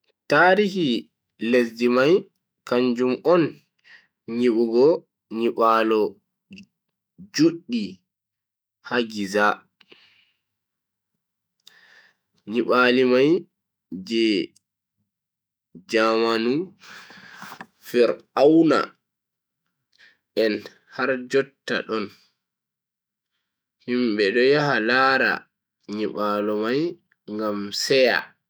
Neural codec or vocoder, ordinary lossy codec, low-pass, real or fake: autoencoder, 48 kHz, 128 numbers a frame, DAC-VAE, trained on Japanese speech; none; none; fake